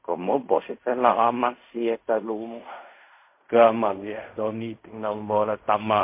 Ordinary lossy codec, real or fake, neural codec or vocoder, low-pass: MP3, 24 kbps; fake; codec, 16 kHz in and 24 kHz out, 0.4 kbps, LongCat-Audio-Codec, fine tuned four codebook decoder; 3.6 kHz